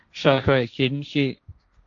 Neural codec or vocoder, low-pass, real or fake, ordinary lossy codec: codec, 16 kHz, 1.1 kbps, Voila-Tokenizer; 7.2 kHz; fake; AAC, 64 kbps